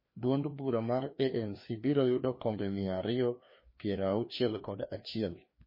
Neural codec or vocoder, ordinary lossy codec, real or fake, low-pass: codec, 16 kHz, 2 kbps, FreqCodec, larger model; MP3, 24 kbps; fake; 5.4 kHz